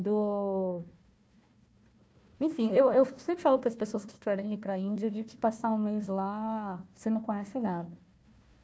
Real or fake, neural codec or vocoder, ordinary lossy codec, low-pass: fake; codec, 16 kHz, 1 kbps, FunCodec, trained on Chinese and English, 50 frames a second; none; none